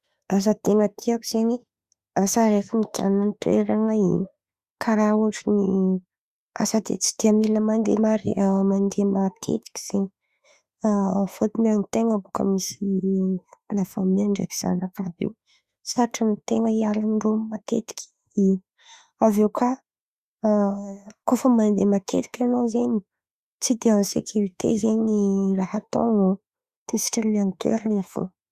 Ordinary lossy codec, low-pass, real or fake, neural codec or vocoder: Opus, 64 kbps; 14.4 kHz; fake; autoencoder, 48 kHz, 32 numbers a frame, DAC-VAE, trained on Japanese speech